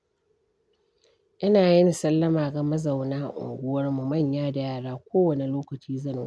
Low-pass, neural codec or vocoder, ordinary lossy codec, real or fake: none; none; none; real